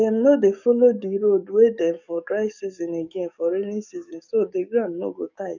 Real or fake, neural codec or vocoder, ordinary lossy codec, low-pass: fake; codec, 44.1 kHz, 7.8 kbps, DAC; none; 7.2 kHz